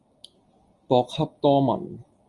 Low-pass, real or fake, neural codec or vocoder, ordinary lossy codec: 10.8 kHz; real; none; Opus, 32 kbps